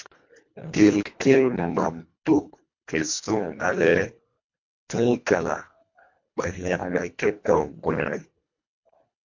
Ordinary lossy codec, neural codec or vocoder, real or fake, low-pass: MP3, 48 kbps; codec, 24 kHz, 1.5 kbps, HILCodec; fake; 7.2 kHz